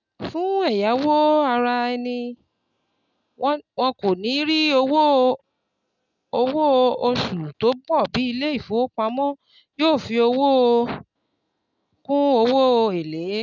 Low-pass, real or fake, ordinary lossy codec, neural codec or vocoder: 7.2 kHz; real; none; none